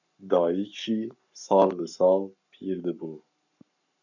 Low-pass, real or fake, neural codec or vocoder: 7.2 kHz; fake; codec, 44.1 kHz, 7.8 kbps, Pupu-Codec